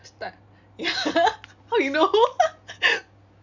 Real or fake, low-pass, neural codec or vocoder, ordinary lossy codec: real; 7.2 kHz; none; none